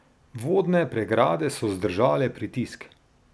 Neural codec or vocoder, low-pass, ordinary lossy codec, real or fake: none; none; none; real